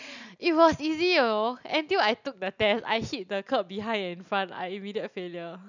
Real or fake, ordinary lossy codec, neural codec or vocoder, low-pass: real; none; none; 7.2 kHz